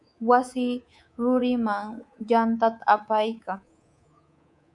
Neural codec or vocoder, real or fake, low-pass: codec, 24 kHz, 3.1 kbps, DualCodec; fake; 10.8 kHz